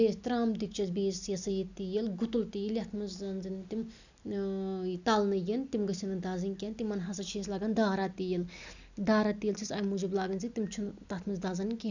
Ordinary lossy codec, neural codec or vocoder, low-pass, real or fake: none; none; 7.2 kHz; real